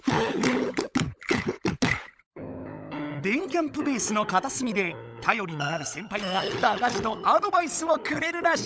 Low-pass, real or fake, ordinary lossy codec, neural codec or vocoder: none; fake; none; codec, 16 kHz, 16 kbps, FunCodec, trained on LibriTTS, 50 frames a second